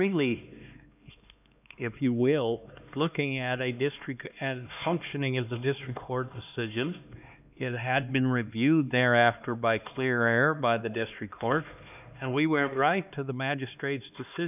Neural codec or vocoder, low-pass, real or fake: codec, 16 kHz, 2 kbps, X-Codec, HuBERT features, trained on LibriSpeech; 3.6 kHz; fake